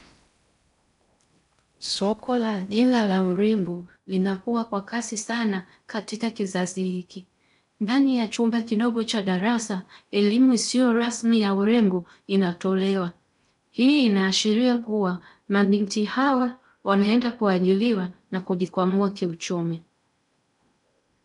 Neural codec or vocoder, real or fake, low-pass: codec, 16 kHz in and 24 kHz out, 0.6 kbps, FocalCodec, streaming, 4096 codes; fake; 10.8 kHz